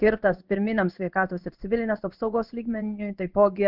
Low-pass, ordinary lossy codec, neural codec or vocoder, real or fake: 5.4 kHz; Opus, 32 kbps; codec, 16 kHz in and 24 kHz out, 1 kbps, XY-Tokenizer; fake